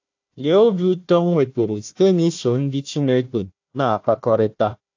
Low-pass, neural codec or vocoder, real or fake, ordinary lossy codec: 7.2 kHz; codec, 16 kHz, 1 kbps, FunCodec, trained on Chinese and English, 50 frames a second; fake; AAC, 48 kbps